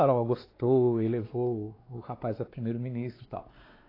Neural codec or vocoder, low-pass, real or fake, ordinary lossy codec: codec, 16 kHz, 4 kbps, X-Codec, WavLM features, trained on Multilingual LibriSpeech; 5.4 kHz; fake; AAC, 24 kbps